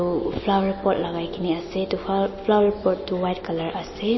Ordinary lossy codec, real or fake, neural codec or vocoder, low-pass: MP3, 24 kbps; real; none; 7.2 kHz